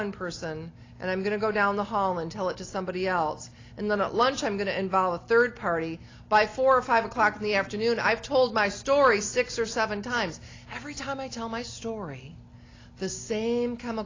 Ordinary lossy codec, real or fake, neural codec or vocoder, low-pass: AAC, 32 kbps; real; none; 7.2 kHz